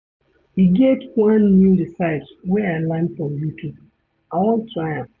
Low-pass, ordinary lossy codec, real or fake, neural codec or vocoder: 7.2 kHz; none; real; none